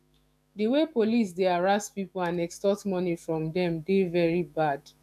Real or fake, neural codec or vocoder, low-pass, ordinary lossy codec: fake; autoencoder, 48 kHz, 128 numbers a frame, DAC-VAE, trained on Japanese speech; 14.4 kHz; none